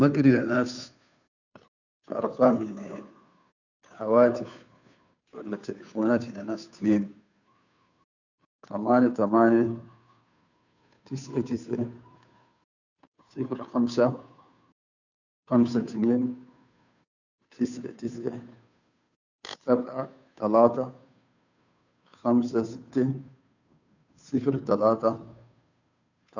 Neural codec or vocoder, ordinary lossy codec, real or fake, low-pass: codec, 16 kHz, 2 kbps, FunCodec, trained on Chinese and English, 25 frames a second; none; fake; 7.2 kHz